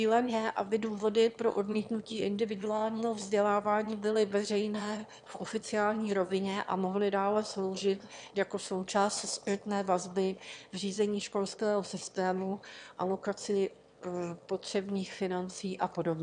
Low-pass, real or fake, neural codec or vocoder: 9.9 kHz; fake; autoencoder, 22.05 kHz, a latent of 192 numbers a frame, VITS, trained on one speaker